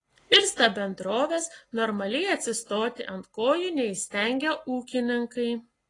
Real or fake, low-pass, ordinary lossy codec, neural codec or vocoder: real; 10.8 kHz; AAC, 32 kbps; none